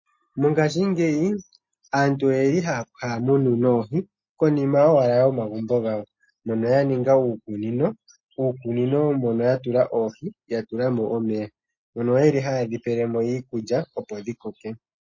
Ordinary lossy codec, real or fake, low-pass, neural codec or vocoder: MP3, 32 kbps; real; 7.2 kHz; none